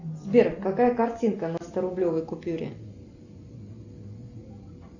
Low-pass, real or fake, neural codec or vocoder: 7.2 kHz; real; none